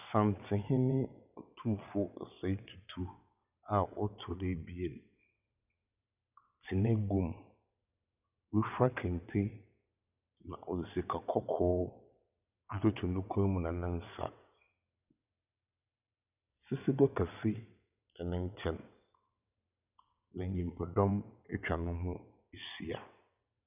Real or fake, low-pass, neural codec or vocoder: fake; 3.6 kHz; vocoder, 22.05 kHz, 80 mel bands, WaveNeXt